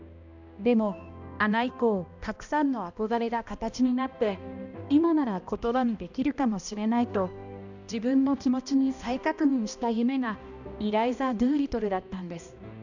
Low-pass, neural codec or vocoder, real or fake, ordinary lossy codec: 7.2 kHz; codec, 16 kHz, 1 kbps, X-Codec, HuBERT features, trained on balanced general audio; fake; none